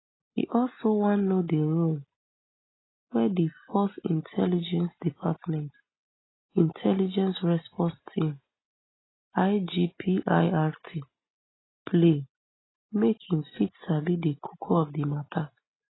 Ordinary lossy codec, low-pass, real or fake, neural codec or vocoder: AAC, 16 kbps; 7.2 kHz; real; none